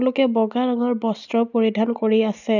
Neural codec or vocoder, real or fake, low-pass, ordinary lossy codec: none; real; 7.2 kHz; none